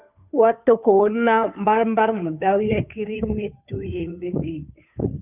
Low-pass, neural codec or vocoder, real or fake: 3.6 kHz; codec, 16 kHz, 2 kbps, FunCodec, trained on Chinese and English, 25 frames a second; fake